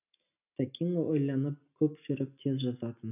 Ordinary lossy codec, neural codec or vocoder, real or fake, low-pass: none; none; real; 3.6 kHz